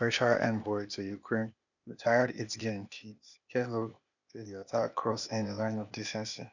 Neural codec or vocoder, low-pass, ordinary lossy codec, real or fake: codec, 16 kHz, 0.8 kbps, ZipCodec; 7.2 kHz; none; fake